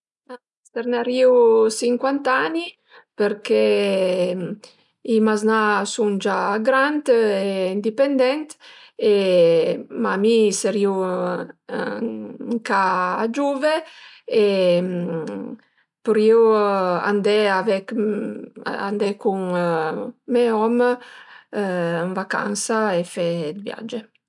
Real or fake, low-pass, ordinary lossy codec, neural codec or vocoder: real; 10.8 kHz; none; none